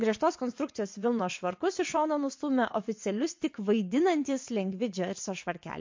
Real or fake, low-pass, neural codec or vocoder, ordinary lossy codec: fake; 7.2 kHz; vocoder, 22.05 kHz, 80 mel bands, WaveNeXt; MP3, 48 kbps